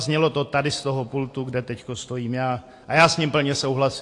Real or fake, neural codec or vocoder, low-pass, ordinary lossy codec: real; none; 10.8 kHz; AAC, 48 kbps